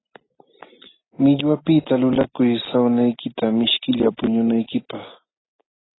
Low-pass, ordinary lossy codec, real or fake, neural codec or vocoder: 7.2 kHz; AAC, 16 kbps; real; none